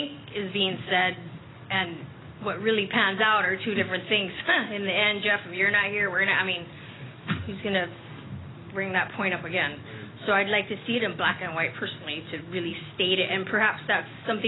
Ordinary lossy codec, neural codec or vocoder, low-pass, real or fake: AAC, 16 kbps; none; 7.2 kHz; real